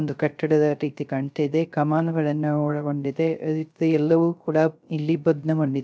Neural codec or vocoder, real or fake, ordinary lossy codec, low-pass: codec, 16 kHz, 0.3 kbps, FocalCodec; fake; none; none